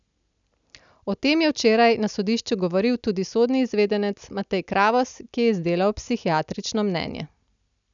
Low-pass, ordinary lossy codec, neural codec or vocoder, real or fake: 7.2 kHz; none; none; real